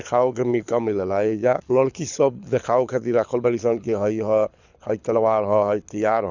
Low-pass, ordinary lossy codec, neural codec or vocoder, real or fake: 7.2 kHz; none; codec, 24 kHz, 6 kbps, HILCodec; fake